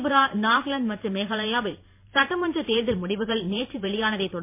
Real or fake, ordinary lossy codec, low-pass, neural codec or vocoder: fake; MP3, 24 kbps; 3.6 kHz; vocoder, 44.1 kHz, 128 mel bands every 512 samples, BigVGAN v2